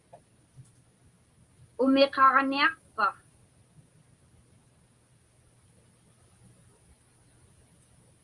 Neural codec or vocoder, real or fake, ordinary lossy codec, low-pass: none; real; Opus, 32 kbps; 10.8 kHz